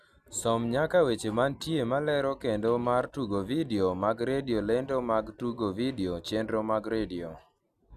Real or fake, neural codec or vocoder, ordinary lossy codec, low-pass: real; none; none; 14.4 kHz